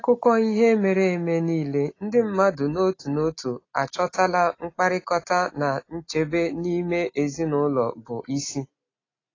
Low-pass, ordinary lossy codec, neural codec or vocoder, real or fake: 7.2 kHz; AAC, 32 kbps; none; real